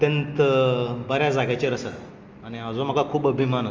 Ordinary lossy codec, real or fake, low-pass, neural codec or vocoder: Opus, 32 kbps; real; 7.2 kHz; none